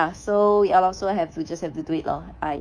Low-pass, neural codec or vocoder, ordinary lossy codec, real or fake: 9.9 kHz; codec, 24 kHz, 3.1 kbps, DualCodec; none; fake